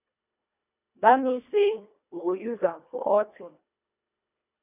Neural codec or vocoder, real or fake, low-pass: codec, 24 kHz, 1.5 kbps, HILCodec; fake; 3.6 kHz